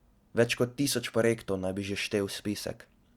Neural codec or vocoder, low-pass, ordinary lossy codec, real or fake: none; 19.8 kHz; Opus, 64 kbps; real